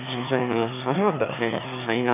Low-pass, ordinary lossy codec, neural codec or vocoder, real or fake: 3.6 kHz; none; autoencoder, 22.05 kHz, a latent of 192 numbers a frame, VITS, trained on one speaker; fake